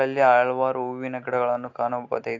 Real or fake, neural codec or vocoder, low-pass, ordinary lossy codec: real; none; 7.2 kHz; AAC, 48 kbps